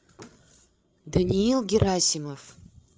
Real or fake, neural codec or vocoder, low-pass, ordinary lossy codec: fake; codec, 16 kHz, 8 kbps, FreqCodec, larger model; none; none